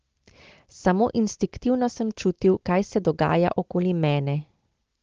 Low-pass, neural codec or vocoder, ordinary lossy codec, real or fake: 7.2 kHz; none; Opus, 16 kbps; real